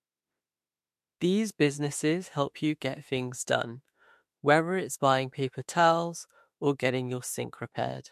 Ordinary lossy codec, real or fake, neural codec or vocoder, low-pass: MP3, 64 kbps; fake; autoencoder, 48 kHz, 32 numbers a frame, DAC-VAE, trained on Japanese speech; 14.4 kHz